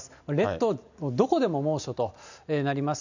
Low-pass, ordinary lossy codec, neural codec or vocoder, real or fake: 7.2 kHz; none; none; real